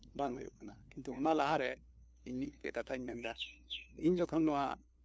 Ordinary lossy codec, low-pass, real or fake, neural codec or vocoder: none; none; fake; codec, 16 kHz, 2 kbps, FunCodec, trained on LibriTTS, 25 frames a second